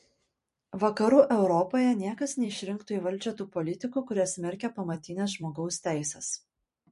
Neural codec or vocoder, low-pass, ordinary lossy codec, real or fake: vocoder, 44.1 kHz, 128 mel bands every 256 samples, BigVGAN v2; 14.4 kHz; MP3, 48 kbps; fake